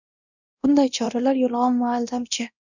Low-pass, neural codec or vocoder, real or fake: 7.2 kHz; codec, 24 kHz, 0.9 kbps, DualCodec; fake